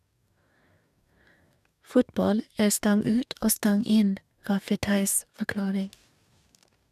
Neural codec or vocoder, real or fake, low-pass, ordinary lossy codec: codec, 44.1 kHz, 2.6 kbps, DAC; fake; 14.4 kHz; none